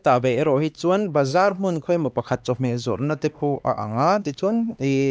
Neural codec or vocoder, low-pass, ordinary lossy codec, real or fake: codec, 16 kHz, 2 kbps, X-Codec, HuBERT features, trained on LibriSpeech; none; none; fake